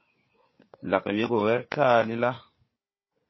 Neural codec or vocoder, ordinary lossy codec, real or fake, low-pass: codec, 16 kHz, 4 kbps, FunCodec, trained on Chinese and English, 50 frames a second; MP3, 24 kbps; fake; 7.2 kHz